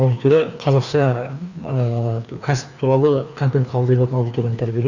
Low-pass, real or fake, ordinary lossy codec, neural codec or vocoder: 7.2 kHz; fake; none; codec, 16 kHz, 2 kbps, FreqCodec, larger model